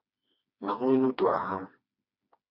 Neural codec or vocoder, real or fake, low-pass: codec, 16 kHz, 2 kbps, FreqCodec, smaller model; fake; 5.4 kHz